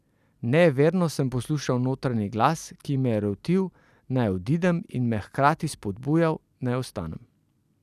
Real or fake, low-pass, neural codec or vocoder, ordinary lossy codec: real; 14.4 kHz; none; none